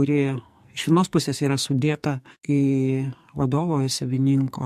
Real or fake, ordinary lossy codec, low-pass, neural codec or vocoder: fake; MP3, 64 kbps; 14.4 kHz; codec, 44.1 kHz, 2.6 kbps, SNAC